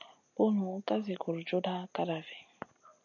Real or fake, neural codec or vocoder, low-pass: real; none; 7.2 kHz